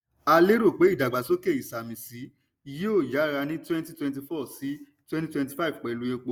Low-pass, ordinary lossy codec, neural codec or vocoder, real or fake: none; none; none; real